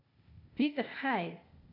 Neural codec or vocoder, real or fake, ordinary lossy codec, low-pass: codec, 16 kHz, 0.8 kbps, ZipCodec; fake; none; 5.4 kHz